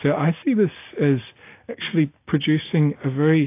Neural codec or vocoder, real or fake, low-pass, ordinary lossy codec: none; real; 3.6 kHz; AAC, 24 kbps